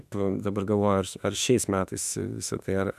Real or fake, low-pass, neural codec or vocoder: fake; 14.4 kHz; autoencoder, 48 kHz, 32 numbers a frame, DAC-VAE, trained on Japanese speech